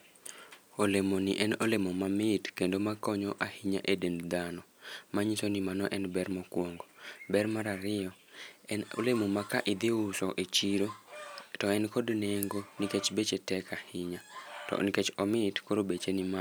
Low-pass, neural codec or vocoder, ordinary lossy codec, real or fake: none; none; none; real